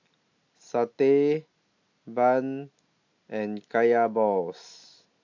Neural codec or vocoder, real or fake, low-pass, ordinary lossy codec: none; real; 7.2 kHz; AAC, 48 kbps